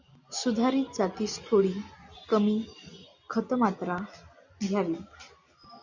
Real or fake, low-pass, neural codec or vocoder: real; 7.2 kHz; none